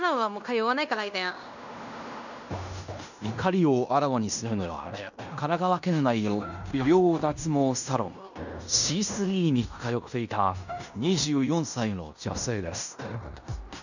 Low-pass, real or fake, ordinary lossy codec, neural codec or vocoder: 7.2 kHz; fake; none; codec, 16 kHz in and 24 kHz out, 0.9 kbps, LongCat-Audio-Codec, fine tuned four codebook decoder